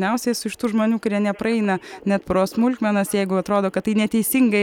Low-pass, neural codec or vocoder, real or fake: 19.8 kHz; vocoder, 44.1 kHz, 128 mel bands every 256 samples, BigVGAN v2; fake